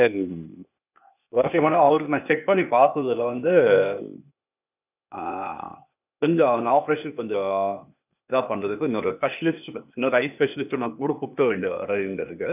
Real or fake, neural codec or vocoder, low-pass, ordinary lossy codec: fake; codec, 16 kHz, 0.8 kbps, ZipCodec; 3.6 kHz; none